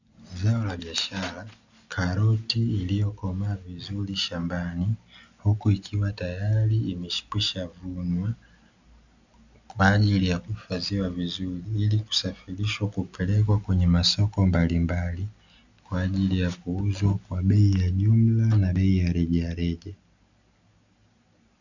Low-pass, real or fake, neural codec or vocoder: 7.2 kHz; real; none